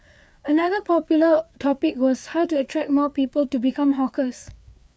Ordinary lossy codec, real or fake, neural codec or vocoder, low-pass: none; fake; codec, 16 kHz, 4 kbps, FreqCodec, larger model; none